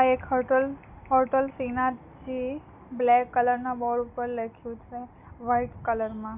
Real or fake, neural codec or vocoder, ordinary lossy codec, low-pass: real; none; none; 3.6 kHz